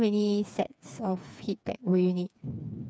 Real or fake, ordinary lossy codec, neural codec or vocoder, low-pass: fake; none; codec, 16 kHz, 4 kbps, FreqCodec, smaller model; none